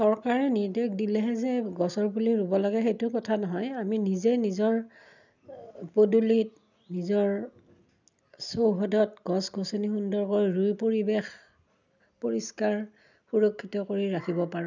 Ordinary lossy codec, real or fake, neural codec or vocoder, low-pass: none; real; none; 7.2 kHz